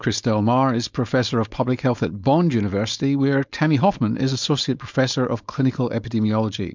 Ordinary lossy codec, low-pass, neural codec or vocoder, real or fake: MP3, 64 kbps; 7.2 kHz; codec, 16 kHz, 4.8 kbps, FACodec; fake